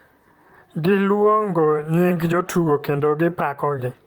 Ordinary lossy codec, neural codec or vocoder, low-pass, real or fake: Opus, 32 kbps; vocoder, 44.1 kHz, 128 mel bands, Pupu-Vocoder; 19.8 kHz; fake